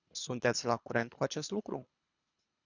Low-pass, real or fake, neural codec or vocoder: 7.2 kHz; fake; codec, 24 kHz, 3 kbps, HILCodec